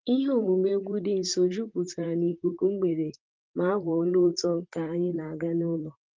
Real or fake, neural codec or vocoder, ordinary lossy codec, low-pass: fake; vocoder, 44.1 kHz, 128 mel bands, Pupu-Vocoder; Opus, 24 kbps; 7.2 kHz